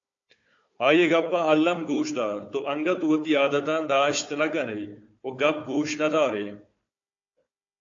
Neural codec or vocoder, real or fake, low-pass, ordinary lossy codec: codec, 16 kHz, 4 kbps, FunCodec, trained on Chinese and English, 50 frames a second; fake; 7.2 kHz; AAC, 48 kbps